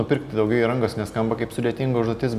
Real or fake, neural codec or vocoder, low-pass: real; none; 14.4 kHz